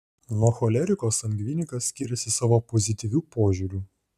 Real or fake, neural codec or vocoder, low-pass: real; none; 14.4 kHz